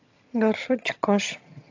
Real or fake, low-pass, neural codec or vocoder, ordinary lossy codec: fake; 7.2 kHz; vocoder, 22.05 kHz, 80 mel bands, HiFi-GAN; AAC, 48 kbps